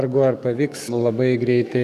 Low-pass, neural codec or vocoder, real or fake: 14.4 kHz; codec, 44.1 kHz, 7.8 kbps, DAC; fake